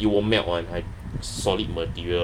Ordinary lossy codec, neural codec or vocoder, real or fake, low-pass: none; none; real; 19.8 kHz